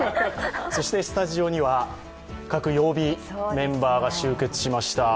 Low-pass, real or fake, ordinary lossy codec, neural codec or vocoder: none; real; none; none